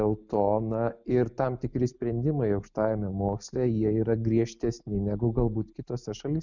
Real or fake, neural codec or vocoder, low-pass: real; none; 7.2 kHz